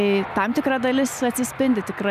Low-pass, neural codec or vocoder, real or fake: 14.4 kHz; none; real